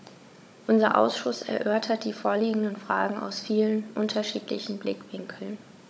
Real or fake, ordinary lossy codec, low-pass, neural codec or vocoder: fake; none; none; codec, 16 kHz, 16 kbps, FunCodec, trained on Chinese and English, 50 frames a second